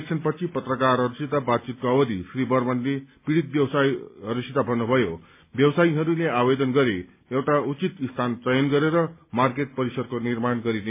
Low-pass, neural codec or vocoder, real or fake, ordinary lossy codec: 3.6 kHz; none; real; MP3, 24 kbps